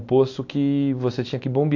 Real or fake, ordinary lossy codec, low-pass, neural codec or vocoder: real; AAC, 48 kbps; 7.2 kHz; none